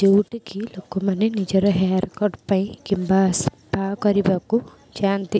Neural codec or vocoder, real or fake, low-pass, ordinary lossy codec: none; real; none; none